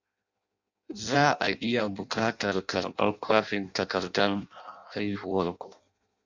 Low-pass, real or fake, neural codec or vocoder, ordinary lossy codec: 7.2 kHz; fake; codec, 16 kHz in and 24 kHz out, 0.6 kbps, FireRedTTS-2 codec; Opus, 64 kbps